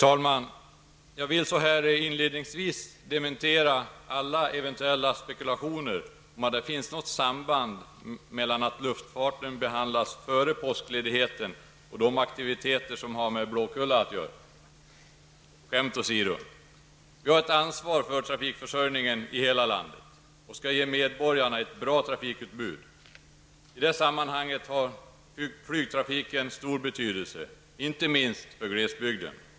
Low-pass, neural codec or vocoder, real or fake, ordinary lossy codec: none; none; real; none